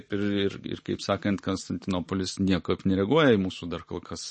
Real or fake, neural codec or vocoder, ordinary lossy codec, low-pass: real; none; MP3, 32 kbps; 10.8 kHz